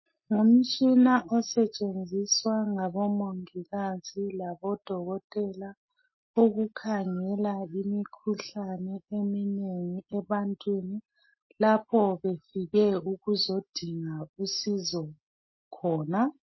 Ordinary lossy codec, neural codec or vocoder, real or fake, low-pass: MP3, 24 kbps; none; real; 7.2 kHz